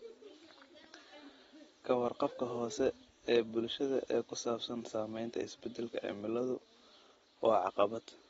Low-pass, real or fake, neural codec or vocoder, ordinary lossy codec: 19.8 kHz; real; none; AAC, 24 kbps